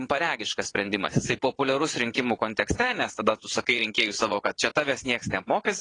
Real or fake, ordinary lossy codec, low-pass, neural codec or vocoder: fake; AAC, 32 kbps; 9.9 kHz; vocoder, 22.05 kHz, 80 mel bands, WaveNeXt